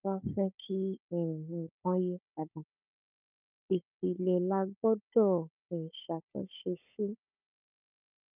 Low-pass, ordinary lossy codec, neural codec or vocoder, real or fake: 3.6 kHz; none; codec, 16 kHz, 16 kbps, FunCodec, trained on Chinese and English, 50 frames a second; fake